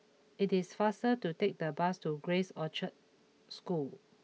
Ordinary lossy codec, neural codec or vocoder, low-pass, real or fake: none; none; none; real